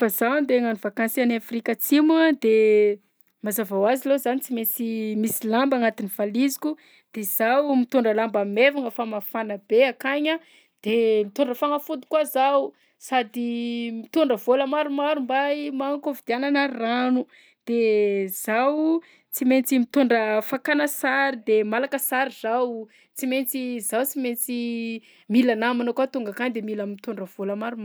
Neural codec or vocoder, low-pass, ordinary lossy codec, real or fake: none; none; none; real